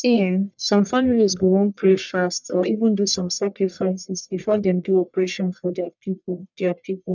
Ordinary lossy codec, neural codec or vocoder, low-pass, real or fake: none; codec, 44.1 kHz, 1.7 kbps, Pupu-Codec; 7.2 kHz; fake